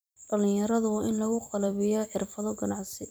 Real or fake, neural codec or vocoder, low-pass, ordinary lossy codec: fake; vocoder, 44.1 kHz, 128 mel bands every 256 samples, BigVGAN v2; none; none